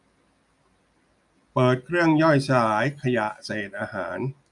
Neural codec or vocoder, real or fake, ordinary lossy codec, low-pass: none; real; none; 10.8 kHz